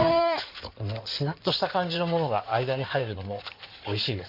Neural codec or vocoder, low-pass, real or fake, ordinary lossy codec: codec, 16 kHz in and 24 kHz out, 2.2 kbps, FireRedTTS-2 codec; 5.4 kHz; fake; MP3, 48 kbps